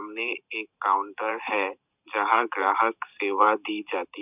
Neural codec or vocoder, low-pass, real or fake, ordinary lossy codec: vocoder, 44.1 kHz, 128 mel bands every 512 samples, BigVGAN v2; 3.6 kHz; fake; none